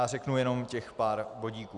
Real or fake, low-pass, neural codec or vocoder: real; 10.8 kHz; none